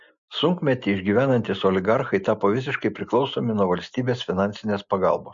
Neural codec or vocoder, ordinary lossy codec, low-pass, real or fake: none; MP3, 48 kbps; 7.2 kHz; real